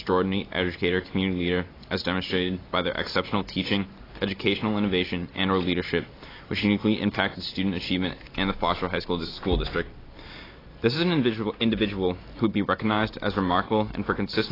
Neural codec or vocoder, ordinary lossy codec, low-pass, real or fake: none; AAC, 24 kbps; 5.4 kHz; real